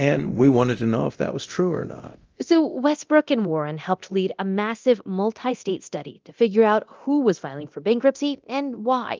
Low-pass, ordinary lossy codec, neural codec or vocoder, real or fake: 7.2 kHz; Opus, 24 kbps; codec, 24 kHz, 0.9 kbps, DualCodec; fake